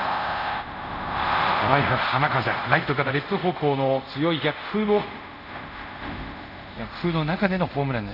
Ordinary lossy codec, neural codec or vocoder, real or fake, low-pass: none; codec, 24 kHz, 0.5 kbps, DualCodec; fake; 5.4 kHz